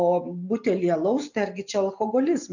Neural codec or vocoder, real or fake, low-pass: none; real; 7.2 kHz